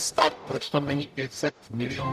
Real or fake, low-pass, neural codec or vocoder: fake; 14.4 kHz; codec, 44.1 kHz, 0.9 kbps, DAC